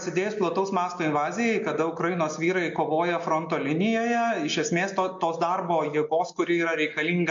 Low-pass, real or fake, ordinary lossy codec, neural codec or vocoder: 7.2 kHz; real; MP3, 48 kbps; none